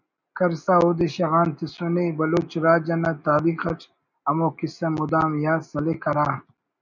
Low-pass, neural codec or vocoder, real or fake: 7.2 kHz; none; real